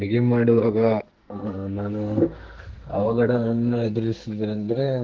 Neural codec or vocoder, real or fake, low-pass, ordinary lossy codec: codec, 44.1 kHz, 2.6 kbps, SNAC; fake; 7.2 kHz; Opus, 16 kbps